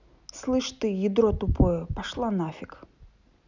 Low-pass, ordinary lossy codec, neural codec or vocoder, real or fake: 7.2 kHz; none; none; real